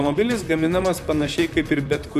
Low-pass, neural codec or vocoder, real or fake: 14.4 kHz; vocoder, 44.1 kHz, 128 mel bands, Pupu-Vocoder; fake